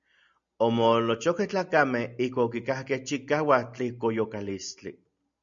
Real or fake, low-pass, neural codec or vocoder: real; 7.2 kHz; none